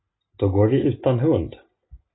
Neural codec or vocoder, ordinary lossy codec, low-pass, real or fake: none; AAC, 16 kbps; 7.2 kHz; real